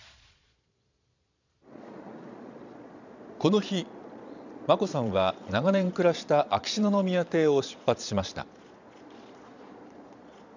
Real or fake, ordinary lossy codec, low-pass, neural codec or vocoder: fake; none; 7.2 kHz; vocoder, 22.05 kHz, 80 mel bands, Vocos